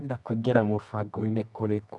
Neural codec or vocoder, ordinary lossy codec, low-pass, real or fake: codec, 24 kHz, 0.9 kbps, WavTokenizer, medium music audio release; none; 10.8 kHz; fake